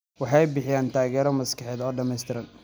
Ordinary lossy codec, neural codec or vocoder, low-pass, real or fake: none; none; none; real